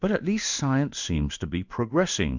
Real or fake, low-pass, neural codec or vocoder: fake; 7.2 kHz; codec, 16 kHz in and 24 kHz out, 1 kbps, XY-Tokenizer